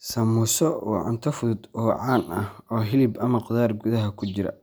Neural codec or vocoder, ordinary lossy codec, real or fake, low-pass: none; none; real; none